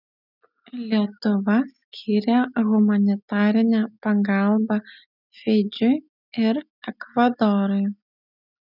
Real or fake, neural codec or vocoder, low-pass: real; none; 5.4 kHz